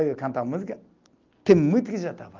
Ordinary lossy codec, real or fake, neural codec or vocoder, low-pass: Opus, 32 kbps; real; none; 7.2 kHz